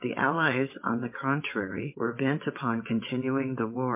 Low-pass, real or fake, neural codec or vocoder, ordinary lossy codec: 3.6 kHz; fake; vocoder, 22.05 kHz, 80 mel bands, WaveNeXt; MP3, 32 kbps